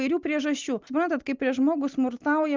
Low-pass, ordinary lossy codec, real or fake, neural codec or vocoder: 7.2 kHz; Opus, 24 kbps; real; none